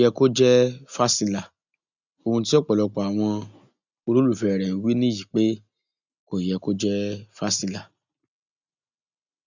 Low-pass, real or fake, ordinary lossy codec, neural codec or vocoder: 7.2 kHz; real; none; none